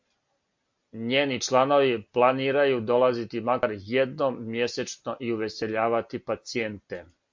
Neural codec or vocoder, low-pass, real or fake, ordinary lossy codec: none; 7.2 kHz; real; MP3, 48 kbps